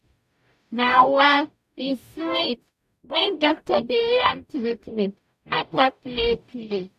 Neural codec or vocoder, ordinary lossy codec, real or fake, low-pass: codec, 44.1 kHz, 0.9 kbps, DAC; none; fake; 14.4 kHz